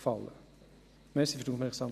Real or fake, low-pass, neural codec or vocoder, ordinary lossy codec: real; 14.4 kHz; none; none